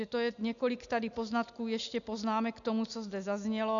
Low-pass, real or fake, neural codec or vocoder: 7.2 kHz; real; none